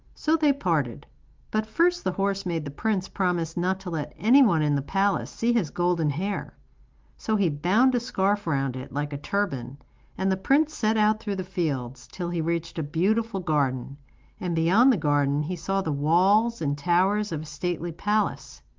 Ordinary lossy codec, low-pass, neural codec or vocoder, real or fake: Opus, 32 kbps; 7.2 kHz; none; real